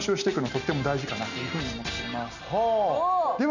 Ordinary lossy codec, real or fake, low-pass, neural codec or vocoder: none; real; 7.2 kHz; none